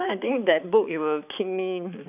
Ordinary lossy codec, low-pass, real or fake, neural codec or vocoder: none; 3.6 kHz; fake; codec, 16 kHz, 4 kbps, X-Codec, HuBERT features, trained on balanced general audio